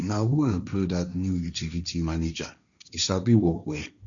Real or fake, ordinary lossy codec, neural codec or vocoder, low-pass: fake; none; codec, 16 kHz, 1.1 kbps, Voila-Tokenizer; 7.2 kHz